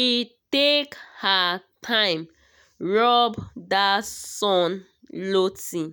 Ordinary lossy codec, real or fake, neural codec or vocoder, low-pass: none; real; none; none